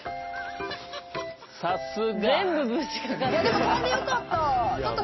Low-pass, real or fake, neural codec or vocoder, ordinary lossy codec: 7.2 kHz; real; none; MP3, 24 kbps